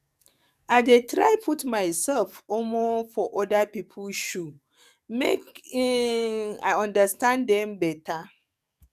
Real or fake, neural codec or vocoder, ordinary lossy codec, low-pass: fake; codec, 44.1 kHz, 7.8 kbps, DAC; none; 14.4 kHz